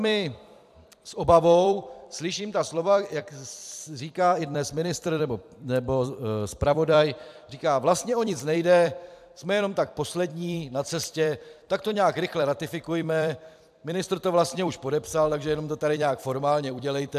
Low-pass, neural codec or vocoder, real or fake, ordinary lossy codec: 14.4 kHz; vocoder, 44.1 kHz, 128 mel bands every 256 samples, BigVGAN v2; fake; AAC, 96 kbps